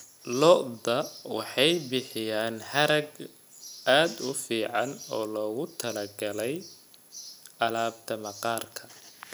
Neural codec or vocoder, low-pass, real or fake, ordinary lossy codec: none; none; real; none